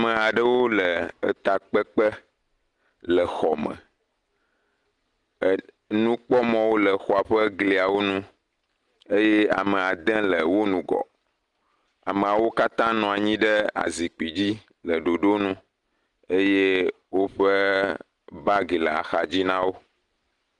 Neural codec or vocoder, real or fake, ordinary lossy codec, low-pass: none; real; Opus, 24 kbps; 10.8 kHz